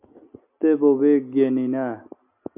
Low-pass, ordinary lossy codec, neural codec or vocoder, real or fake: 3.6 kHz; MP3, 32 kbps; none; real